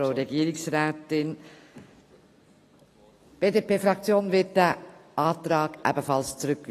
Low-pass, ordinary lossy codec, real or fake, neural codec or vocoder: 14.4 kHz; AAC, 48 kbps; real; none